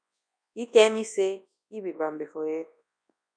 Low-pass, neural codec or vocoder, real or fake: 9.9 kHz; codec, 24 kHz, 0.9 kbps, WavTokenizer, large speech release; fake